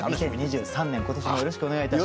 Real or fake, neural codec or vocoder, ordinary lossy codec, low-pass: real; none; none; none